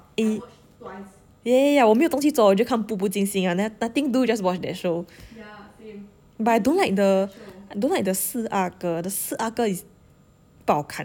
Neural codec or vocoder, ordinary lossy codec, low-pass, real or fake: none; none; none; real